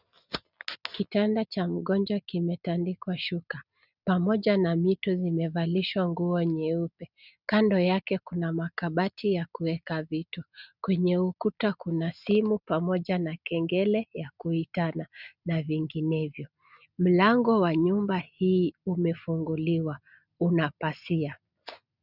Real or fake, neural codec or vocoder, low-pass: real; none; 5.4 kHz